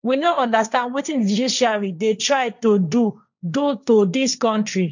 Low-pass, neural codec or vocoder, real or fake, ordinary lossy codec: 7.2 kHz; codec, 16 kHz, 1.1 kbps, Voila-Tokenizer; fake; none